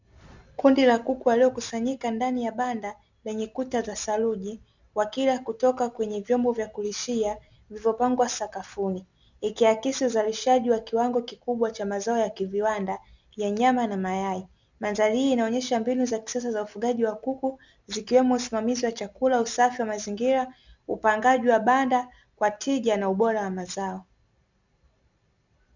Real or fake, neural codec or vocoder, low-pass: real; none; 7.2 kHz